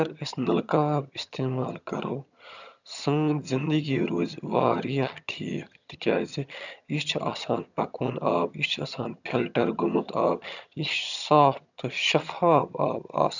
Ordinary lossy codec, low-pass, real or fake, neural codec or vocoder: none; 7.2 kHz; fake; vocoder, 22.05 kHz, 80 mel bands, HiFi-GAN